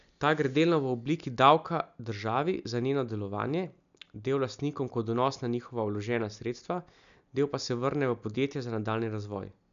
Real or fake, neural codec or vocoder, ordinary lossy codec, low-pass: real; none; none; 7.2 kHz